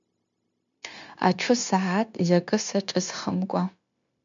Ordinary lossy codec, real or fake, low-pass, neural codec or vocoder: MP3, 64 kbps; fake; 7.2 kHz; codec, 16 kHz, 0.9 kbps, LongCat-Audio-Codec